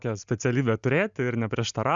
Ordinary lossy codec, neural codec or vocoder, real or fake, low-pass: MP3, 96 kbps; none; real; 7.2 kHz